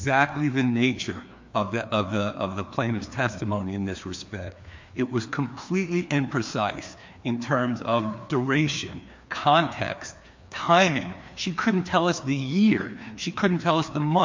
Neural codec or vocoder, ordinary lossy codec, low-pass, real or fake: codec, 16 kHz, 2 kbps, FreqCodec, larger model; MP3, 48 kbps; 7.2 kHz; fake